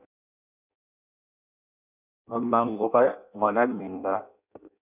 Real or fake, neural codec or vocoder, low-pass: fake; codec, 16 kHz in and 24 kHz out, 0.6 kbps, FireRedTTS-2 codec; 3.6 kHz